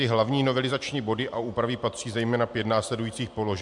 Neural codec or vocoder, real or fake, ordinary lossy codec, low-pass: none; real; MP3, 64 kbps; 10.8 kHz